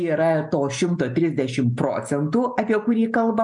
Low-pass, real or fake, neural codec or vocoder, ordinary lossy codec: 10.8 kHz; real; none; MP3, 96 kbps